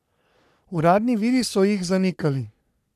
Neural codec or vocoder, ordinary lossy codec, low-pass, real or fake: codec, 44.1 kHz, 3.4 kbps, Pupu-Codec; none; 14.4 kHz; fake